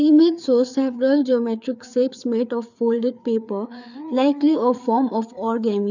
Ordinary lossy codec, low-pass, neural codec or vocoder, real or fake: none; 7.2 kHz; codec, 16 kHz, 4 kbps, FreqCodec, larger model; fake